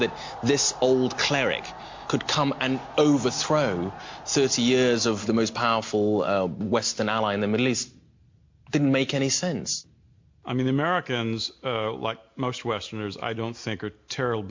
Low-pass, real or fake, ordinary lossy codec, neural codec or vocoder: 7.2 kHz; real; MP3, 48 kbps; none